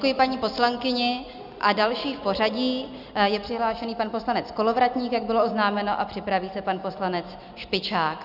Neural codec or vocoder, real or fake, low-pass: none; real; 5.4 kHz